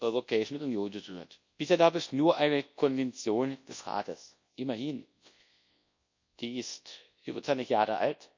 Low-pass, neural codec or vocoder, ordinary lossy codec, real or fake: 7.2 kHz; codec, 24 kHz, 0.9 kbps, WavTokenizer, large speech release; MP3, 48 kbps; fake